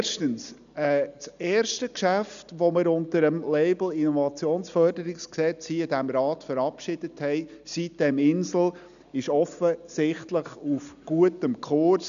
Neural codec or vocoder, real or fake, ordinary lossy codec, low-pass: none; real; none; 7.2 kHz